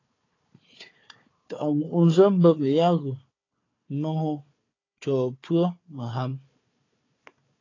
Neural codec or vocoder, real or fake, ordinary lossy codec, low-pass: codec, 16 kHz, 4 kbps, FunCodec, trained on Chinese and English, 50 frames a second; fake; AAC, 32 kbps; 7.2 kHz